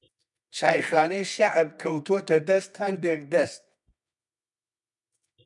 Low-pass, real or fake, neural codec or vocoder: 10.8 kHz; fake; codec, 24 kHz, 0.9 kbps, WavTokenizer, medium music audio release